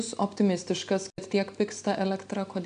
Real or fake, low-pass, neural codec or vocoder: real; 9.9 kHz; none